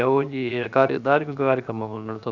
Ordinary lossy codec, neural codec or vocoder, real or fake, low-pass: none; codec, 16 kHz, 0.7 kbps, FocalCodec; fake; 7.2 kHz